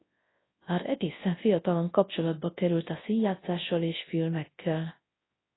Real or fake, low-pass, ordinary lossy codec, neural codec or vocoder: fake; 7.2 kHz; AAC, 16 kbps; codec, 24 kHz, 0.9 kbps, WavTokenizer, large speech release